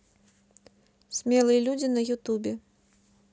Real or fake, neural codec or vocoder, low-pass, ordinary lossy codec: real; none; none; none